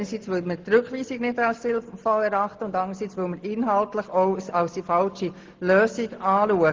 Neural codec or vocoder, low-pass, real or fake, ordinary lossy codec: none; 7.2 kHz; real; Opus, 16 kbps